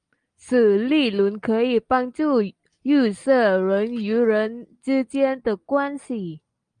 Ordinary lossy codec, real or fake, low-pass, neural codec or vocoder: Opus, 32 kbps; real; 9.9 kHz; none